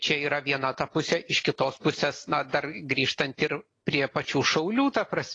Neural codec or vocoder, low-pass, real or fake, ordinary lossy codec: none; 9.9 kHz; real; AAC, 32 kbps